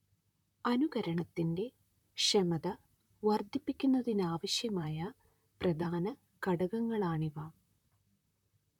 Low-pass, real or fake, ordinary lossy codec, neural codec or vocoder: 19.8 kHz; fake; none; vocoder, 44.1 kHz, 128 mel bands, Pupu-Vocoder